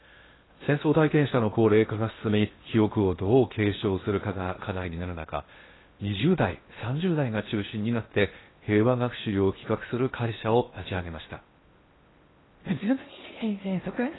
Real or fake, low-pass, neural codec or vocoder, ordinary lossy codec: fake; 7.2 kHz; codec, 16 kHz in and 24 kHz out, 0.8 kbps, FocalCodec, streaming, 65536 codes; AAC, 16 kbps